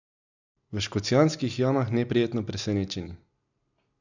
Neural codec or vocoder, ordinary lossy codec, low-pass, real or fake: none; none; 7.2 kHz; real